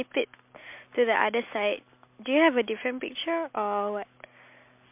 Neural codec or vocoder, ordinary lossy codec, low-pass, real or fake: none; MP3, 32 kbps; 3.6 kHz; real